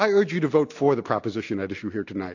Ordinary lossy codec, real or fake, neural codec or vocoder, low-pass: AAC, 48 kbps; real; none; 7.2 kHz